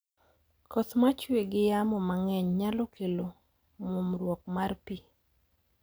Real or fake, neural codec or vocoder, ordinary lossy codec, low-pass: real; none; none; none